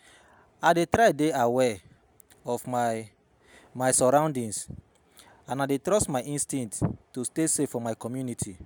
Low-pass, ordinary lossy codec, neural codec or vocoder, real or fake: none; none; none; real